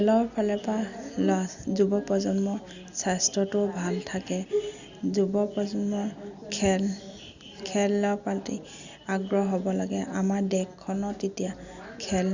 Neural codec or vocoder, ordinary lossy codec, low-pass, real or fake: none; Opus, 64 kbps; 7.2 kHz; real